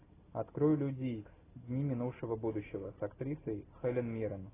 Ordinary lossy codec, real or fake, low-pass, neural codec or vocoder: AAC, 16 kbps; real; 3.6 kHz; none